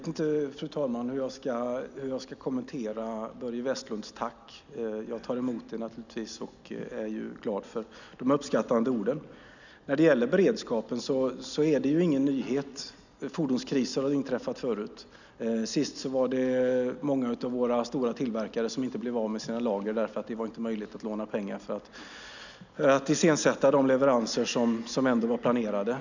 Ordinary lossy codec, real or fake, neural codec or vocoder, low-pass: none; real; none; 7.2 kHz